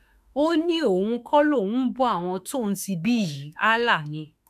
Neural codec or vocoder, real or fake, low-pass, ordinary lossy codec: autoencoder, 48 kHz, 32 numbers a frame, DAC-VAE, trained on Japanese speech; fake; 14.4 kHz; none